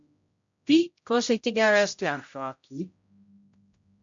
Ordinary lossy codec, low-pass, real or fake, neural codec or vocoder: MP3, 48 kbps; 7.2 kHz; fake; codec, 16 kHz, 0.5 kbps, X-Codec, HuBERT features, trained on general audio